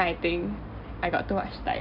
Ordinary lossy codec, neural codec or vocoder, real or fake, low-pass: none; none; real; 5.4 kHz